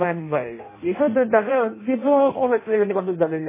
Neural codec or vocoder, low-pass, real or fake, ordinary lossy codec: codec, 16 kHz in and 24 kHz out, 0.6 kbps, FireRedTTS-2 codec; 3.6 kHz; fake; MP3, 16 kbps